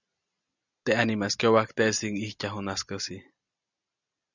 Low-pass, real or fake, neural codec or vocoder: 7.2 kHz; real; none